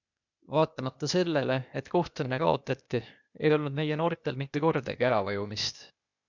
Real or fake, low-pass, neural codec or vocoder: fake; 7.2 kHz; codec, 16 kHz, 0.8 kbps, ZipCodec